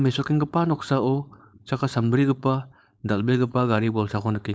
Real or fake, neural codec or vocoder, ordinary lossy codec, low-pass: fake; codec, 16 kHz, 4.8 kbps, FACodec; none; none